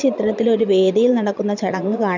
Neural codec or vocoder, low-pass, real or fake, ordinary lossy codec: vocoder, 22.05 kHz, 80 mel bands, Vocos; 7.2 kHz; fake; none